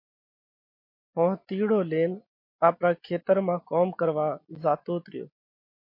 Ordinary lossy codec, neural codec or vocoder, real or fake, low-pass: MP3, 32 kbps; none; real; 5.4 kHz